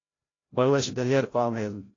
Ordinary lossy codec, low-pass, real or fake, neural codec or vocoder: AAC, 32 kbps; 7.2 kHz; fake; codec, 16 kHz, 0.5 kbps, FreqCodec, larger model